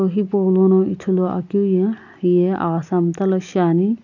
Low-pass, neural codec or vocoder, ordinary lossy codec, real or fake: 7.2 kHz; none; none; real